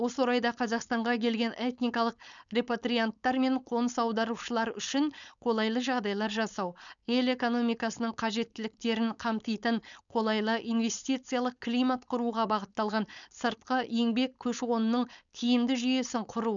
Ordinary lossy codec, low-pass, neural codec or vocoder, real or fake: none; 7.2 kHz; codec, 16 kHz, 4.8 kbps, FACodec; fake